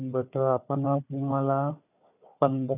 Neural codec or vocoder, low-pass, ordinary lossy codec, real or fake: codec, 16 kHz, 4 kbps, FunCodec, trained on Chinese and English, 50 frames a second; 3.6 kHz; none; fake